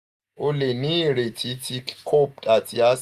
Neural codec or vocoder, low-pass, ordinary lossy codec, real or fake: none; 14.4 kHz; Opus, 64 kbps; real